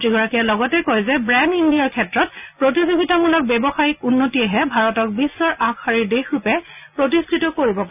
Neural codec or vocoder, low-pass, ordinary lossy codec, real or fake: none; 3.6 kHz; none; real